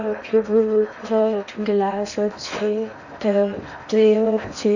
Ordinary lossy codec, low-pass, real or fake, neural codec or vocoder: none; 7.2 kHz; fake; codec, 16 kHz in and 24 kHz out, 0.8 kbps, FocalCodec, streaming, 65536 codes